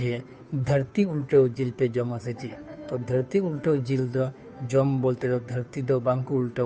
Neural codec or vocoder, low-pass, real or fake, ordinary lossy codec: codec, 16 kHz, 2 kbps, FunCodec, trained on Chinese and English, 25 frames a second; none; fake; none